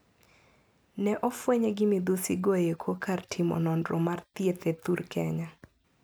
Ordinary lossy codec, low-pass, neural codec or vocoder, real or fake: none; none; none; real